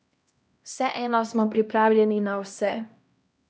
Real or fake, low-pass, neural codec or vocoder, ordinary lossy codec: fake; none; codec, 16 kHz, 1 kbps, X-Codec, HuBERT features, trained on LibriSpeech; none